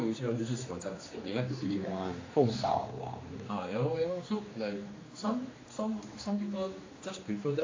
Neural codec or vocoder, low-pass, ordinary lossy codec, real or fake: codec, 16 kHz, 2 kbps, X-Codec, HuBERT features, trained on general audio; 7.2 kHz; AAC, 32 kbps; fake